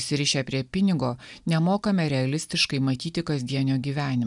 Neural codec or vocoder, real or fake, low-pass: none; real; 10.8 kHz